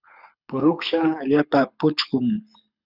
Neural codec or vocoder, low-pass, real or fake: codec, 24 kHz, 6 kbps, HILCodec; 5.4 kHz; fake